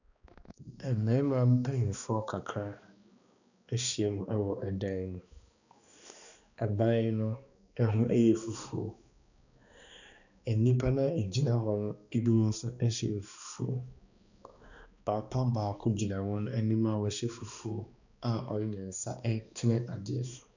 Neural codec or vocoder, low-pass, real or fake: codec, 16 kHz, 2 kbps, X-Codec, HuBERT features, trained on balanced general audio; 7.2 kHz; fake